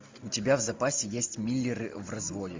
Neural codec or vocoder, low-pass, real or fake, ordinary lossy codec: none; 7.2 kHz; real; MP3, 64 kbps